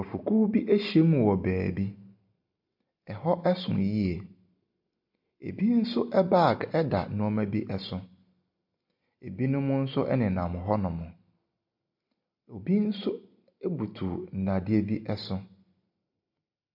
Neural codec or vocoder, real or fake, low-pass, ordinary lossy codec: none; real; 5.4 kHz; MP3, 48 kbps